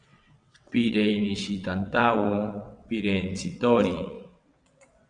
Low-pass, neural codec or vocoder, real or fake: 9.9 kHz; vocoder, 22.05 kHz, 80 mel bands, WaveNeXt; fake